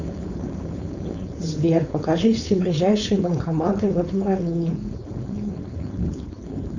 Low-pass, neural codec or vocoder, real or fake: 7.2 kHz; codec, 16 kHz, 4.8 kbps, FACodec; fake